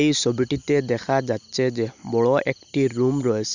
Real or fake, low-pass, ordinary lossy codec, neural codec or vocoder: real; 7.2 kHz; none; none